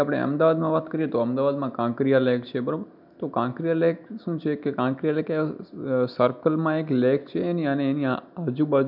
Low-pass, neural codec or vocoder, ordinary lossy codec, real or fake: 5.4 kHz; none; none; real